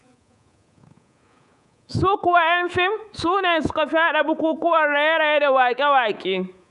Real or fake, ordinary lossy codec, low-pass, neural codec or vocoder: fake; none; 10.8 kHz; codec, 24 kHz, 3.1 kbps, DualCodec